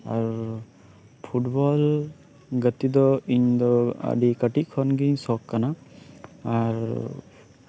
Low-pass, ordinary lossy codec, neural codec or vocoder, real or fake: none; none; none; real